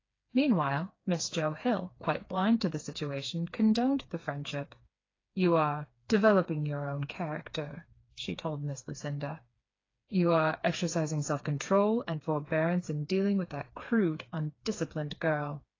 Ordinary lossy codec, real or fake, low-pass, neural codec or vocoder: AAC, 32 kbps; fake; 7.2 kHz; codec, 16 kHz, 4 kbps, FreqCodec, smaller model